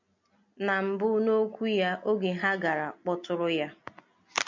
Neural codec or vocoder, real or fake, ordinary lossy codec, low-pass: none; real; MP3, 64 kbps; 7.2 kHz